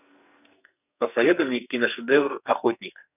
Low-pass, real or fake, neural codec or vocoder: 3.6 kHz; fake; codec, 44.1 kHz, 2.6 kbps, SNAC